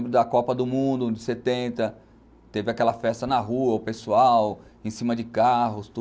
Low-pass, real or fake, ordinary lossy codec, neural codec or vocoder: none; real; none; none